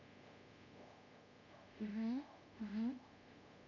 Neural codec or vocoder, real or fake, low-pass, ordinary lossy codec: codec, 24 kHz, 0.5 kbps, DualCodec; fake; 7.2 kHz; MP3, 64 kbps